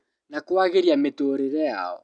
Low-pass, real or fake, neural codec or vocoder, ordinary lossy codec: 9.9 kHz; real; none; none